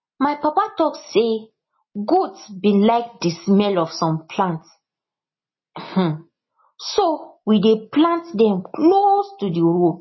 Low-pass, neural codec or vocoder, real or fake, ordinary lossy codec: 7.2 kHz; none; real; MP3, 24 kbps